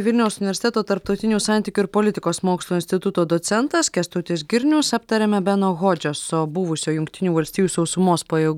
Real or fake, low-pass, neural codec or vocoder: real; 19.8 kHz; none